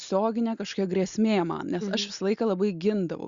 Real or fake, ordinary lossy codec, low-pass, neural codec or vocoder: real; Opus, 64 kbps; 7.2 kHz; none